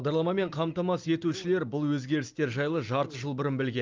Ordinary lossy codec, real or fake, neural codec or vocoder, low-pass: Opus, 32 kbps; real; none; 7.2 kHz